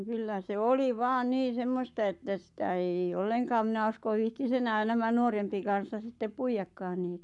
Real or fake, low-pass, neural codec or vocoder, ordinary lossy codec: fake; 10.8 kHz; codec, 44.1 kHz, 7.8 kbps, Pupu-Codec; none